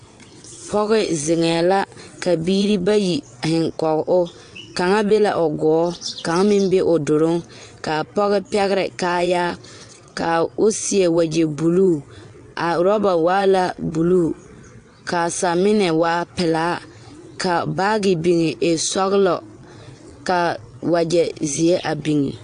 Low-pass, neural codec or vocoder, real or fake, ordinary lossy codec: 9.9 kHz; vocoder, 22.05 kHz, 80 mel bands, WaveNeXt; fake; AAC, 64 kbps